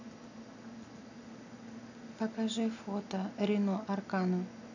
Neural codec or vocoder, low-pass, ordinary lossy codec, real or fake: none; 7.2 kHz; none; real